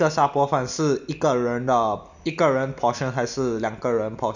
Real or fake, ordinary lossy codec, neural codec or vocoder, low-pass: real; none; none; 7.2 kHz